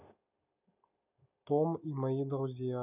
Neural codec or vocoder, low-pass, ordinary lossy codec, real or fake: none; 3.6 kHz; none; real